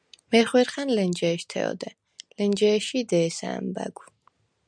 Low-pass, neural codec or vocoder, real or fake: 9.9 kHz; none; real